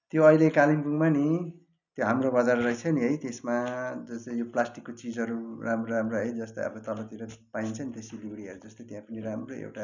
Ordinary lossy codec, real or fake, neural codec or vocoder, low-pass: none; real; none; 7.2 kHz